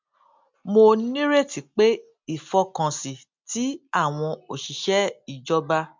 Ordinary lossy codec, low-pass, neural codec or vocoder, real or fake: MP3, 64 kbps; 7.2 kHz; none; real